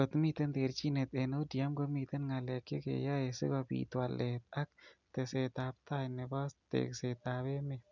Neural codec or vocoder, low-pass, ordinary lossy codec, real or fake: none; 7.2 kHz; none; real